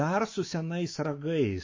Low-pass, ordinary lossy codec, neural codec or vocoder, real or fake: 7.2 kHz; MP3, 32 kbps; autoencoder, 48 kHz, 128 numbers a frame, DAC-VAE, trained on Japanese speech; fake